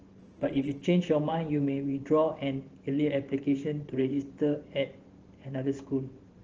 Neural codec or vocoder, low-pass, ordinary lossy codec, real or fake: vocoder, 44.1 kHz, 128 mel bands every 512 samples, BigVGAN v2; 7.2 kHz; Opus, 16 kbps; fake